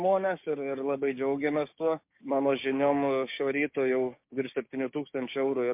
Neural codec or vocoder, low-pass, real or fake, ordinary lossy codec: codec, 16 kHz, 6 kbps, DAC; 3.6 kHz; fake; MP3, 32 kbps